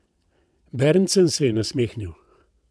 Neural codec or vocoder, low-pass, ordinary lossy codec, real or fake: vocoder, 22.05 kHz, 80 mel bands, WaveNeXt; none; none; fake